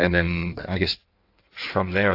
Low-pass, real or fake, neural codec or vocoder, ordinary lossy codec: 5.4 kHz; fake; codec, 16 kHz in and 24 kHz out, 1.1 kbps, FireRedTTS-2 codec; AAC, 32 kbps